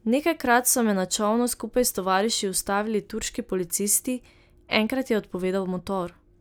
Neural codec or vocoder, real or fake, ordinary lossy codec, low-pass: none; real; none; none